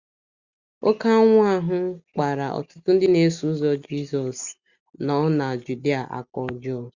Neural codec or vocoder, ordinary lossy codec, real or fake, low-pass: none; Opus, 64 kbps; real; 7.2 kHz